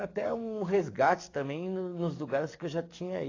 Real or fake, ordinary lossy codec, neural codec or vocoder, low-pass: fake; AAC, 32 kbps; codec, 44.1 kHz, 7.8 kbps, DAC; 7.2 kHz